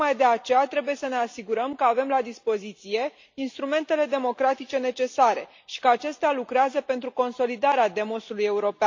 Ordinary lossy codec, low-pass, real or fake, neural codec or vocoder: MP3, 48 kbps; 7.2 kHz; real; none